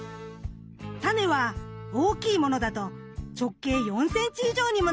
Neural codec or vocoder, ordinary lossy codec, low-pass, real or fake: none; none; none; real